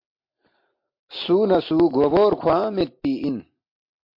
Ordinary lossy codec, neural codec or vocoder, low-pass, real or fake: MP3, 32 kbps; none; 5.4 kHz; real